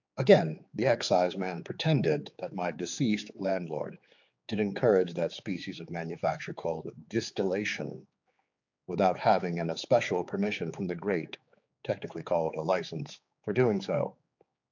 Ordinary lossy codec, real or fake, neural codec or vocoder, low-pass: AAC, 48 kbps; fake; codec, 16 kHz, 4 kbps, X-Codec, HuBERT features, trained on general audio; 7.2 kHz